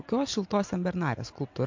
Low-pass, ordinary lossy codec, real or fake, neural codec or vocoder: 7.2 kHz; MP3, 48 kbps; real; none